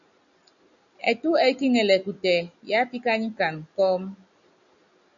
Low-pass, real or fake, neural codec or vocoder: 7.2 kHz; real; none